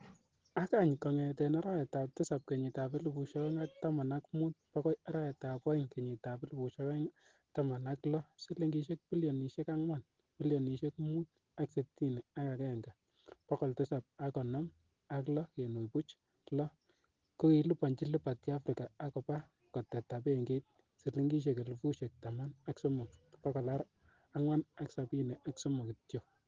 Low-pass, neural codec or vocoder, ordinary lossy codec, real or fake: 7.2 kHz; none; Opus, 16 kbps; real